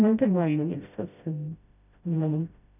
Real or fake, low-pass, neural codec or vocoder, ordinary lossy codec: fake; 3.6 kHz; codec, 16 kHz, 0.5 kbps, FreqCodec, smaller model; none